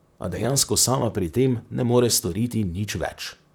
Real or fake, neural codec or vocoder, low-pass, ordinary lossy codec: fake; vocoder, 44.1 kHz, 128 mel bands, Pupu-Vocoder; none; none